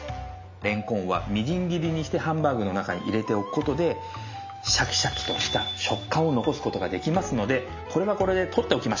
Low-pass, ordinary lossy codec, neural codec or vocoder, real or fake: 7.2 kHz; MP3, 64 kbps; none; real